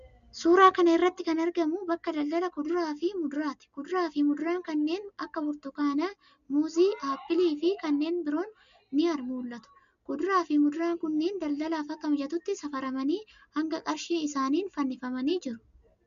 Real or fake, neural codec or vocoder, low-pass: real; none; 7.2 kHz